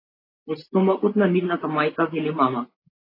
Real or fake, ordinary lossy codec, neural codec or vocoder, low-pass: real; AAC, 24 kbps; none; 5.4 kHz